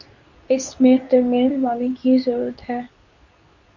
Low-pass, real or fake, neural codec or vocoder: 7.2 kHz; fake; codec, 24 kHz, 0.9 kbps, WavTokenizer, medium speech release version 2